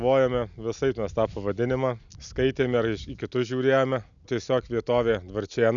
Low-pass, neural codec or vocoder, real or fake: 7.2 kHz; none; real